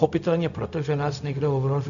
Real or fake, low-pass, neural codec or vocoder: fake; 7.2 kHz; codec, 16 kHz, 0.4 kbps, LongCat-Audio-Codec